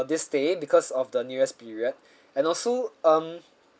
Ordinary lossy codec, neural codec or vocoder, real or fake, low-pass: none; none; real; none